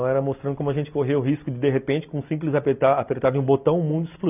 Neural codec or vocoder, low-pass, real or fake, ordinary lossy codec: none; 3.6 kHz; real; none